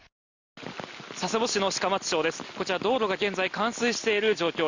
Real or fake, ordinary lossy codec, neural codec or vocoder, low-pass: real; Opus, 64 kbps; none; 7.2 kHz